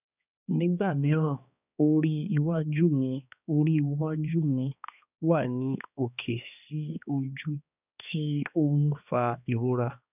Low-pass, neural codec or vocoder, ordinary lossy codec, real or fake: 3.6 kHz; codec, 16 kHz, 2 kbps, X-Codec, HuBERT features, trained on balanced general audio; none; fake